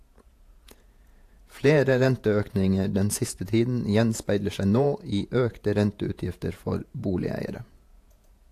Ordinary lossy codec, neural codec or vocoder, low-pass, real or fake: AAC, 64 kbps; vocoder, 48 kHz, 128 mel bands, Vocos; 14.4 kHz; fake